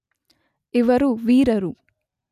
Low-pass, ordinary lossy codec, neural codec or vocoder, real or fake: 14.4 kHz; none; none; real